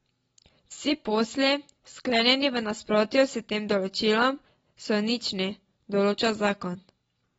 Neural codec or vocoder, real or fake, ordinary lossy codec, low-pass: none; real; AAC, 24 kbps; 19.8 kHz